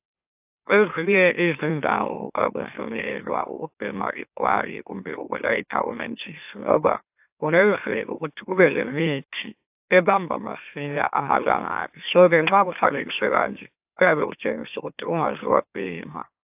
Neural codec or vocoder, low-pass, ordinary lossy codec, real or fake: autoencoder, 44.1 kHz, a latent of 192 numbers a frame, MeloTTS; 3.6 kHz; AAC, 32 kbps; fake